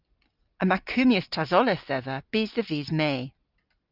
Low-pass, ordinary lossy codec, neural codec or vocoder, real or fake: 5.4 kHz; Opus, 24 kbps; none; real